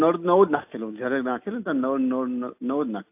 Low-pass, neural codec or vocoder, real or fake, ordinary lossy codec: 3.6 kHz; none; real; AAC, 32 kbps